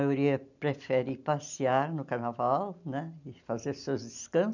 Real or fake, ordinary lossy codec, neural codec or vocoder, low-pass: real; none; none; 7.2 kHz